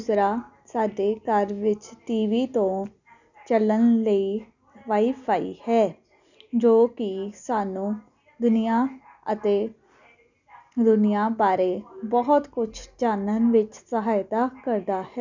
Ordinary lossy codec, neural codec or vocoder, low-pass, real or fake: none; none; 7.2 kHz; real